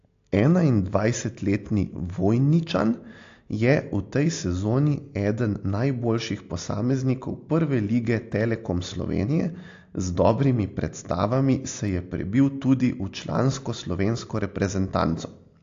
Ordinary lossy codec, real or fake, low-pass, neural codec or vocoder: AAC, 48 kbps; real; 7.2 kHz; none